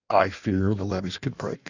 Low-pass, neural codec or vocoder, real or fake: 7.2 kHz; codec, 16 kHz in and 24 kHz out, 1.1 kbps, FireRedTTS-2 codec; fake